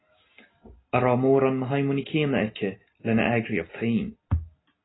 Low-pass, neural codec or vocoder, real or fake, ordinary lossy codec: 7.2 kHz; none; real; AAC, 16 kbps